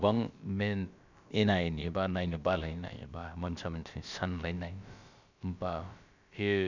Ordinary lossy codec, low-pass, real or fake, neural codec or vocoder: none; 7.2 kHz; fake; codec, 16 kHz, about 1 kbps, DyCAST, with the encoder's durations